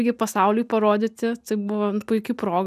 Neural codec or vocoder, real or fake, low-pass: none; real; 14.4 kHz